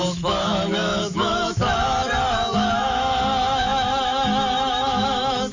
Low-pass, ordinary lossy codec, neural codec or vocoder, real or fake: 7.2 kHz; Opus, 64 kbps; autoencoder, 48 kHz, 128 numbers a frame, DAC-VAE, trained on Japanese speech; fake